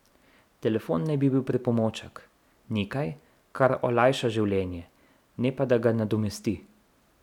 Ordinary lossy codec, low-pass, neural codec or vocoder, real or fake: none; 19.8 kHz; none; real